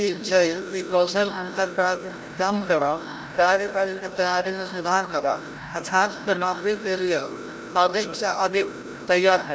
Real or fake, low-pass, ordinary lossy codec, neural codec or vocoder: fake; none; none; codec, 16 kHz, 0.5 kbps, FreqCodec, larger model